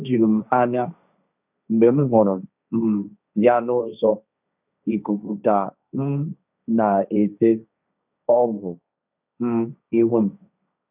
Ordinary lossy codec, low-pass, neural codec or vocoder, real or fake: none; 3.6 kHz; codec, 16 kHz, 1.1 kbps, Voila-Tokenizer; fake